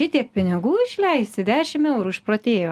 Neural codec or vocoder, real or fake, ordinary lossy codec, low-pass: none; real; Opus, 32 kbps; 14.4 kHz